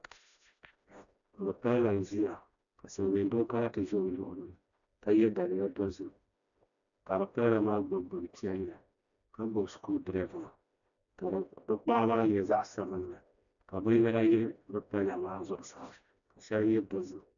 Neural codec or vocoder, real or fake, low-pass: codec, 16 kHz, 1 kbps, FreqCodec, smaller model; fake; 7.2 kHz